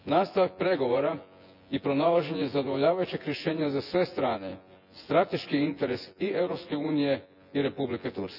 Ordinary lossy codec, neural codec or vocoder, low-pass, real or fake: none; vocoder, 24 kHz, 100 mel bands, Vocos; 5.4 kHz; fake